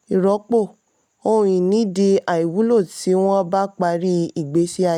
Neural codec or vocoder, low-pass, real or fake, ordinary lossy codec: none; 19.8 kHz; real; none